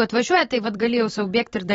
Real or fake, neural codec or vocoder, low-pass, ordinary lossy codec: fake; vocoder, 44.1 kHz, 128 mel bands every 256 samples, BigVGAN v2; 19.8 kHz; AAC, 24 kbps